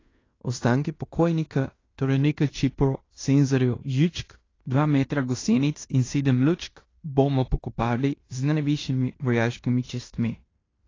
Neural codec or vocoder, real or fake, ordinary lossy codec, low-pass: codec, 16 kHz in and 24 kHz out, 0.9 kbps, LongCat-Audio-Codec, fine tuned four codebook decoder; fake; AAC, 32 kbps; 7.2 kHz